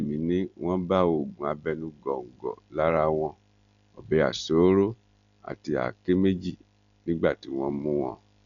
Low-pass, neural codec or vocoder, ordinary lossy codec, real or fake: 7.2 kHz; none; none; real